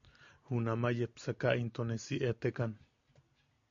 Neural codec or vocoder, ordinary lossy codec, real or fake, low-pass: none; AAC, 64 kbps; real; 7.2 kHz